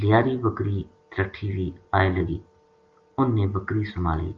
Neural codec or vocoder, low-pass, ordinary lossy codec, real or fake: none; 7.2 kHz; Opus, 32 kbps; real